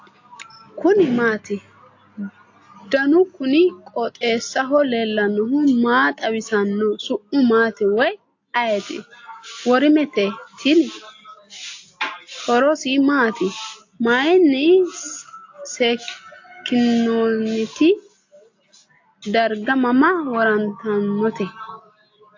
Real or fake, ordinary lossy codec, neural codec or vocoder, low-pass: real; AAC, 48 kbps; none; 7.2 kHz